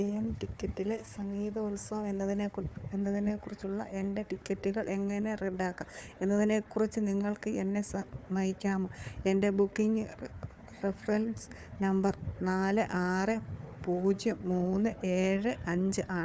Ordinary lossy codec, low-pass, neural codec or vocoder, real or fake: none; none; codec, 16 kHz, 4 kbps, FunCodec, trained on Chinese and English, 50 frames a second; fake